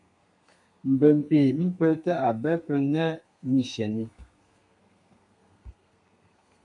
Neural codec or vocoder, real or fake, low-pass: codec, 32 kHz, 1.9 kbps, SNAC; fake; 10.8 kHz